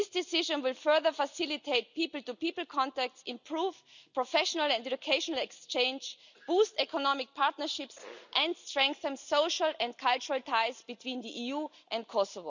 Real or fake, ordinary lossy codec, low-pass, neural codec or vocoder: real; none; 7.2 kHz; none